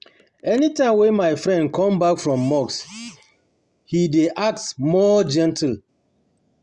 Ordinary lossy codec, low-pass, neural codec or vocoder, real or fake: Opus, 64 kbps; 10.8 kHz; none; real